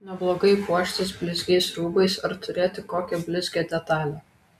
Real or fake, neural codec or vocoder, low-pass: real; none; 14.4 kHz